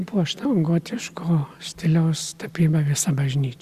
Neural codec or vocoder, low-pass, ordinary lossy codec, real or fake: none; 14.4 kHz; Opus, 64 kbps; real